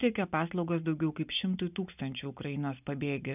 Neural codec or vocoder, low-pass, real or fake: none; 3.6 kHz; real